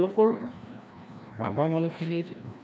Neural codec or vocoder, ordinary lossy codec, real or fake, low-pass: codec, 16 kHz, 1 kbps, FreqCodec, larger model; none; fake; none